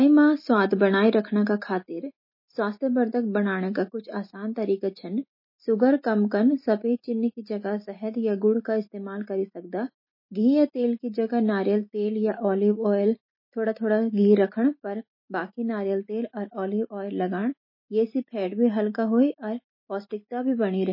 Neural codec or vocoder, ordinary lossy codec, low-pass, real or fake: none; MP3, 24 kbps; 5.4 kHz; real